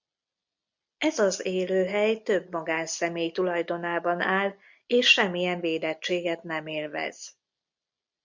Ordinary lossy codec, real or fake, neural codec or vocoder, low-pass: MP3, 64 kbps; real; none; 7.2 kHz